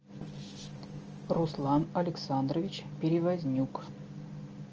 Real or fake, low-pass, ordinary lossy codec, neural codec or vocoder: real; 7.2 kHz; Opus, 24 kbps; none